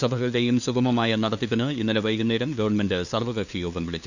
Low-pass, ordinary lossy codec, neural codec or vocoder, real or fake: 7.2 kHz; none; codec, 16 kHz, 2 kbps, FunCodec, trained on LibriTTS, 25 frames a second; fake